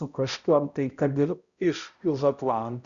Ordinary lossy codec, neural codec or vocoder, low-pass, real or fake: Opus, 64 kbps; codec, 16 kHz, 0.5 kbps, X-Codec, HuBERT features, trained on balanced general audio; 7.2 kHz; fake